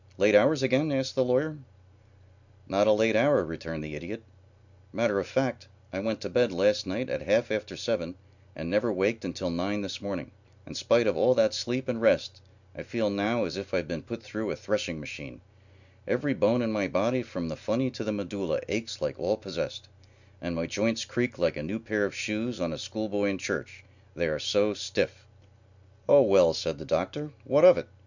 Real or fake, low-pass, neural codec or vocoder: real; 7.2 kHz; none